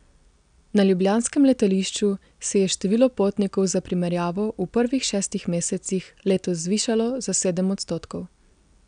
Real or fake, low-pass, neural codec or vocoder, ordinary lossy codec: real; 9.9 kHz; none; none